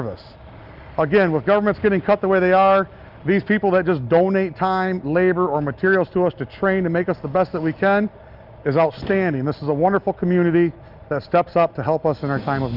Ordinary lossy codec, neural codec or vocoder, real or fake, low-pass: Opus, 24 kbps; none; real; 5.4 kHz